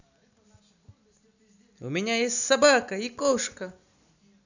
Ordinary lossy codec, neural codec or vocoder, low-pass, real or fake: none; none; 7.2 kHz; real